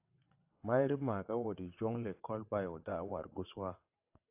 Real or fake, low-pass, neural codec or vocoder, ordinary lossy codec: fake; 3.6 kHz; vocoder, 22.05 kHz, 80 mel bands, WaveNeXt; AAC, 32 kbps